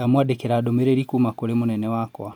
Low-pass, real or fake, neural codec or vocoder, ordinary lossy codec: 19.8 kHz; real; none; MP3, 96 kbps